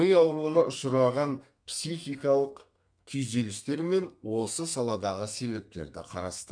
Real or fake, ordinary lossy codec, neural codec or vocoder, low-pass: fake; none; codec, 32 kHz, 1.9 kbps, SNAC; 9.9 kHz